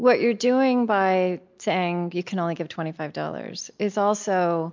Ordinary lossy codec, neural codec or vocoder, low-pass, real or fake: MP3, 64 kbps; none; 7.2 kHz; real